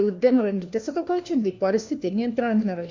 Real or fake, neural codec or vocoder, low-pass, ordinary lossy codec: fake; codec, 16 kHz, 1 kbps, FunCodec, trained on LibriTTS, 50 frames a second; 7.2 kHz; Opus, 64 kbps